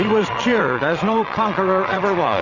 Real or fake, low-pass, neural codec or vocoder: fake; 7.2 kHz; vocoder, 22.05 kHz, 80 mel bands, Vocos